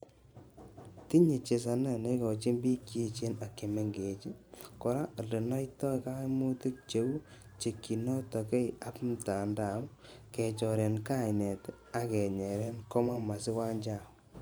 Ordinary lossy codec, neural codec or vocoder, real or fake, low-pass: none; vocoder, 44.1 kHz, 128 mel bands every 512 samples, BigVGAN v2; fake; none